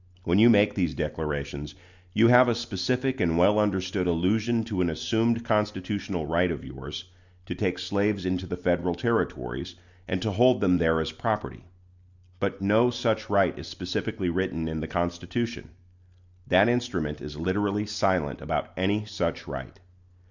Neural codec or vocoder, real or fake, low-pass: none; real; 7.2 kHz